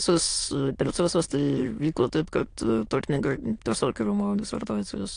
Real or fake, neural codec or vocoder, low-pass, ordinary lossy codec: fake; autoencoder, 22.05 kHz, a latent of 192 numbers a frame, VITS, trained on many speakers; 9.9 kHz; AAC, 48 kbps